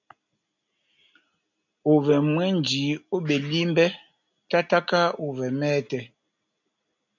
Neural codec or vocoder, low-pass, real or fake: none; 7.2 kHz; real